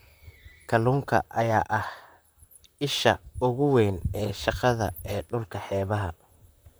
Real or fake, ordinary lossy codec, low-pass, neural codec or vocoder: fake; none; none; vocoder, 44.1 kHz, 128 mel bands, Pupu-Vocoder